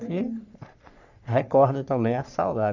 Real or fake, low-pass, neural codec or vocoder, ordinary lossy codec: fake; 7.2 kHz; codec, 44.1 kHz, 3.4 kbps, Pupu-Codec; none